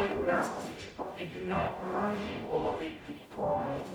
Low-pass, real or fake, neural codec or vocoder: 19.8 kHz; fake; codec, 44.1 kHz, 0.9 kbps, DAC